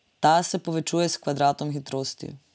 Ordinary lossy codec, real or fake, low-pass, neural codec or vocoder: none; real; none; none